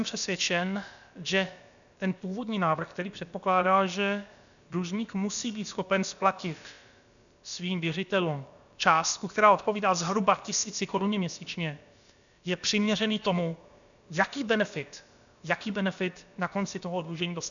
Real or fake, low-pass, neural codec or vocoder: fake; 7.2 kHz; codec, 16 kHz, about 1 kbps, DyCAST, with the encoder's durations